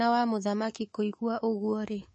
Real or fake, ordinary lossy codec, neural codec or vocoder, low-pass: fake; MP3, 32 kbps; codec, 24 kHz, 3.1 kbps, DualCodec; 10.8 kHz